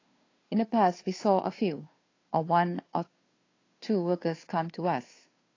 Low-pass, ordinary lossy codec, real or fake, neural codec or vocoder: 7.2 kHz; AAC, 32 kbps; fake; codec, 16 kHz, 2 kbps, FunCodec, trained on Chinese and English, 25 frames a second